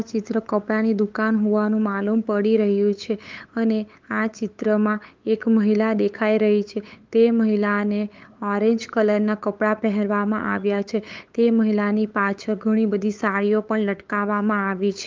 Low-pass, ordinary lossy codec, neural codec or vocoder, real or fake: 7.2 kHz; Opus, 32 kbps; codec, 16 kHz, 8 kbps, FunCodec, trained on LibriTTS, 25 frames a second; fake